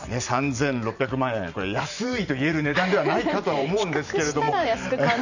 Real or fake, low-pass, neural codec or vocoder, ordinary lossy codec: fake; 7.2 kHz; vocoder, 44.1 kHz, 128 mel bands every 512 samples, BigVGAN v2; none